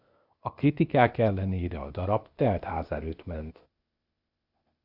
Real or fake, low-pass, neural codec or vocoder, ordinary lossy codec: fake; 5.4 kHz; codec, 16 kHz, 0.8 kbps, ZipCodec; Opus, 64 kbps